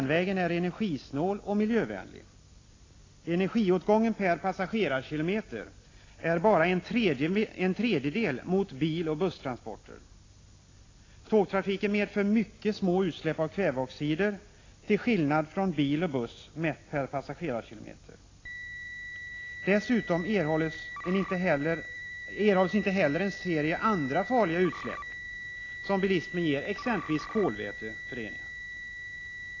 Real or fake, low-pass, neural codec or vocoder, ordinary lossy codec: real; 7.2 kHz; none; AAC, 32 kbps